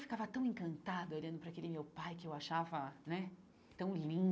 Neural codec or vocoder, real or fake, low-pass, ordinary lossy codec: none; real; none; none